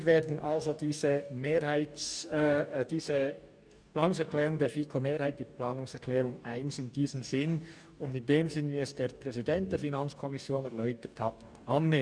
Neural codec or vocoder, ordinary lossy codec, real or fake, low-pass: codec, 44.1 kHz, 2.6 kbps, DAC; none; fake; 9.9 kHz